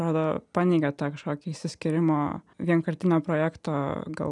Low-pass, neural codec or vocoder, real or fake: 10.8 kHz; none; real